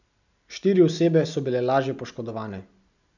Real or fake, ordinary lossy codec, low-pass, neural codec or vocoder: real; none; 7.2 kHz; none